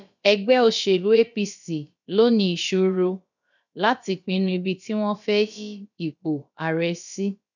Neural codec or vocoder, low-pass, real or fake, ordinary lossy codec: codec, 16 kHz, about 1 kbps, DyCAST, with the encoder's durations; 7.2 kHz; fake; none